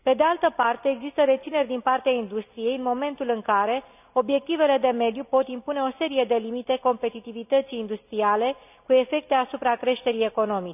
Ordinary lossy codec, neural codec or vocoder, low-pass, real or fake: none; none; 3.6 kHz; real